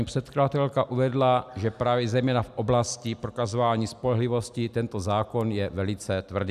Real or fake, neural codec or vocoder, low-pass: real; none; 14.4 kHz